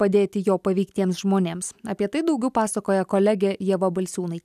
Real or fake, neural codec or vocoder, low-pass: real; none; 14.4 kHz